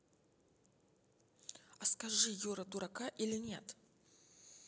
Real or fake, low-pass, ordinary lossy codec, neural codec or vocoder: real; none; none; none